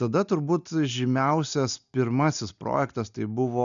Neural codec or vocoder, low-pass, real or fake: none; 7.2 kHz; real